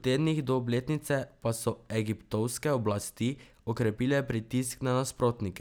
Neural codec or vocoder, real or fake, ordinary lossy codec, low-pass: none; real; none; none